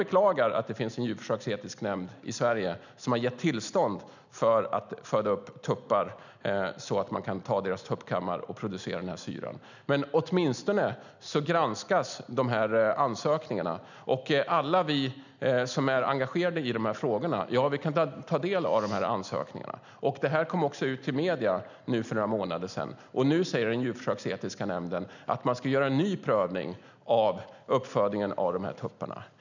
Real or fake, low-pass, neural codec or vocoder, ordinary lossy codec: real; 7.2 kHz; none; none